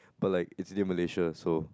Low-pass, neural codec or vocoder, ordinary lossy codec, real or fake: none; none; none; real